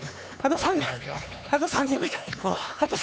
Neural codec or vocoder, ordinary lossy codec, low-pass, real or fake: codec, 16 kHz, 4 kbps, X-Codec, HuBERT features, trained on LibriSpeech; none; none; fake